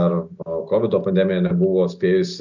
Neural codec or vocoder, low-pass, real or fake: none; 7.2 kHz; real